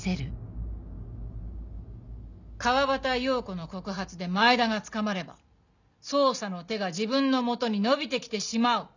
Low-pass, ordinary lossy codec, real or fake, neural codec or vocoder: 7.2 kHz; none; real; none